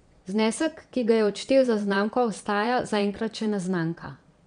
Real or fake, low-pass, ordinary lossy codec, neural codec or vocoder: fake; 9.9 kHz; none; vocoder, 22.05 kHz, 80 mel bands, Vocos